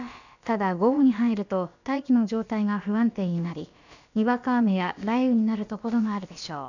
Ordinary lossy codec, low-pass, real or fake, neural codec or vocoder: none; 7.2 kHz; fake; codec, 16 kHz, about 1 kbps, DyCAST, with the encoder's durations